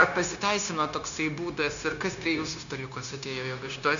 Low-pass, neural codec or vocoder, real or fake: 7.2 kHz; codec, 16 kHz, 0.9 kbps, LongCat-Audio-Codec; fake